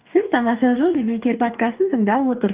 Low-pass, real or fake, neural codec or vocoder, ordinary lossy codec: 3.6 kHz; fake; codec, 44.1 kHz, 2.6 kbps, DAC; Opus, 32 kbps